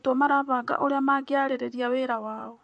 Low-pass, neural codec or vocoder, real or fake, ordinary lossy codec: 10.8 kHz; vocoder, 44.1 kHz, 128 mel bands, Pupu-Vocoder; fake; MP3, 48 kbps